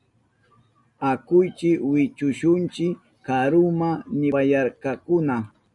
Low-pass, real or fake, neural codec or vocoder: 10.8 kHz; real; none